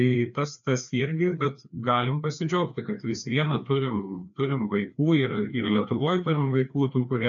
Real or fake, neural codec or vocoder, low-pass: fake; codec, 16 kHz, 2 kbps, FreqCodec, larger model; 7.2 kHz